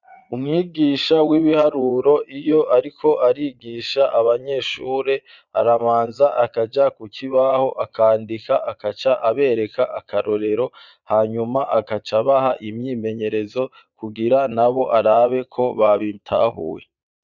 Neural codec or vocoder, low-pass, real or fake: vocoder, 22.05 kHz, 80 mel bands, Vocos; 7.2 kHz; fake